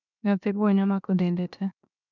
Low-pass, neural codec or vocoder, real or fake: 7.2 kHz; codec, 16 kHz, 0.7 kbps, FocalCodec; fake